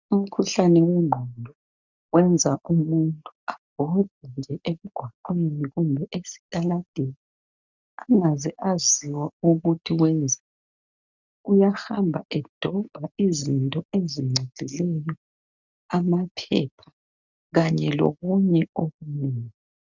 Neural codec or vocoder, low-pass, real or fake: none; 7.2 kHz; real